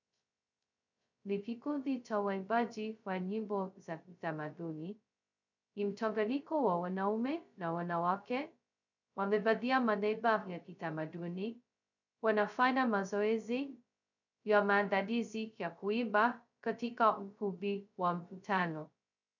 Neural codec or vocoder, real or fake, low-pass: codec, 16 kHz, 0.2 kbps, FocalCodec; fake; 7.2 kHz